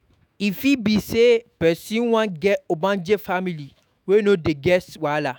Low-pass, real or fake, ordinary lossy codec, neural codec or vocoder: none; fake; none; autoencoder, 48 kHz, 128 numbers a frame, DAC-VAE, trained on Japanese speech